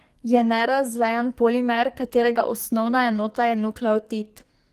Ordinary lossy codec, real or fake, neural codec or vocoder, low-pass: Opus, 24 kbps; fake; codec, 44.1 kHz, 2.6 kbps, SNAC; 14.4 kHz